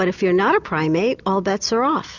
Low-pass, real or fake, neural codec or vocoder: 7.2 kHz; real; none